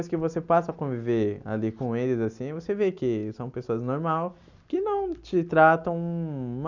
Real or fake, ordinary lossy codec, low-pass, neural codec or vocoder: real; none; 7.2 kHz; none